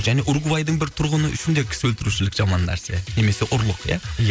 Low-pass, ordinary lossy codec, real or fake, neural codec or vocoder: none; none; real; none